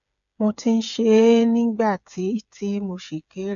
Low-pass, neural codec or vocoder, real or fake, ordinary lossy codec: 7.2 kHz; codec, 16 kHz, 8 kbps, FreqCodec, smaller model; fake; none